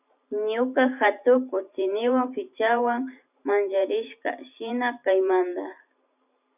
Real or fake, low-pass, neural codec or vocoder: fake; 3.6 kHz; vocoder, 44.1 kHz, 128 mel bands every 512 samples, BigVGAN v2